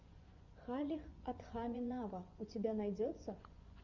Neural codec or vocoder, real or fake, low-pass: vocoder, 24 kHz, 100 mel bands, Vocos; fake; 7.2 kHz